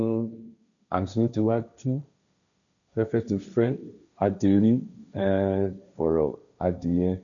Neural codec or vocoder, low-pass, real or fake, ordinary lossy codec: codec, 16 kHz, 1.1 kbps, Voila-Tokenizer; 7.2 kHz; fake; none